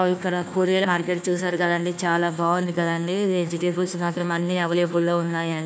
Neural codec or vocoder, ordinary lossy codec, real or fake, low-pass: codec, 16 kHz, 1 kbps, FunCodec, trained on Chinese and English, 50 frames a second; none; fake; none